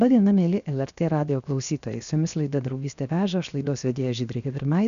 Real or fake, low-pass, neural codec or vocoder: fake; 7.2 kHz; codec, 16 kHz, 0.7 kbps, FocalCodec